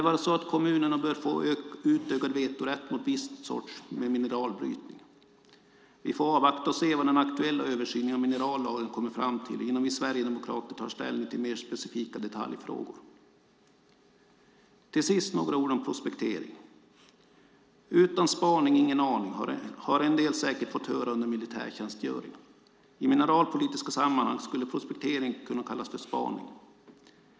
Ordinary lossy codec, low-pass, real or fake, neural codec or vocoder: none; none; real; none